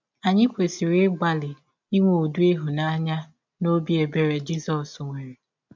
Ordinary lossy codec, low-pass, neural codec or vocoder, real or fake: MP3, 64 kbps; 7.2 kHz; none; real